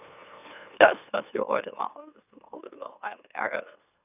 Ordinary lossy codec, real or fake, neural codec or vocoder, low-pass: none; fake; autoencoder, 44.1 kHz, a latent of 192 numbers a frame, MeloTTS; 3.6 kHz